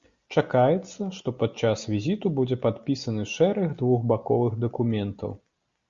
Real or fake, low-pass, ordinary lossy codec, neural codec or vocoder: real; 7.2 kHz; Opus, 64 kbps; none